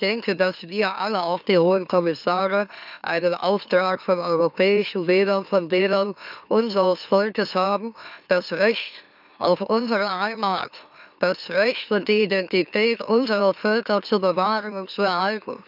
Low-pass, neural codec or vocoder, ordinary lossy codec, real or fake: 5.4 kHz; autoencoder, 44.1 kHz, a latent of 192 numbers a frame, MeloTTS; none; fake